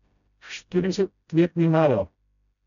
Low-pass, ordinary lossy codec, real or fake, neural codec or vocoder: 7.2 kHz; none; fake; codec, 16 kHz, 0.5 kbps, FreqCodec, smaller model